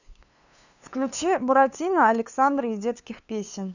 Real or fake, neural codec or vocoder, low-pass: fake; codec, 16 kHz, 2 kbps, FunCodec, trained on LibriTTS, 25 frames a second; 7.2 kHz